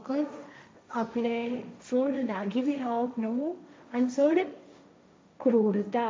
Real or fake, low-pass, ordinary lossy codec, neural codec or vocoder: fake; none; none; codec, 16 kHz, 1.1 kbps, Voila-Tokenizer